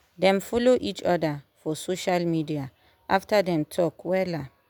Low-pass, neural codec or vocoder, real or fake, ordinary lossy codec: none; none; real; none